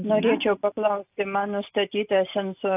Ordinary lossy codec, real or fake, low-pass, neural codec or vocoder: AAC, 32 kbps; real; 3.6 kHz; none